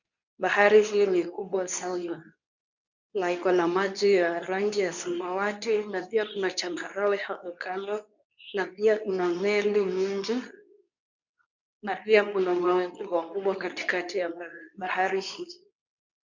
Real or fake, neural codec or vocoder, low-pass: fake; codec, 24 kHz, 0.9 kbps, WavTokenizer, medium speech release version 2; 7.2 kHz